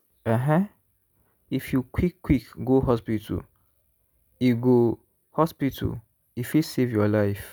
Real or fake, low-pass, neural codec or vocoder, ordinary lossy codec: real; 19.8 kHz; none; none